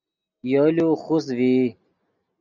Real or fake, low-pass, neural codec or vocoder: real; 7.2 kHz; none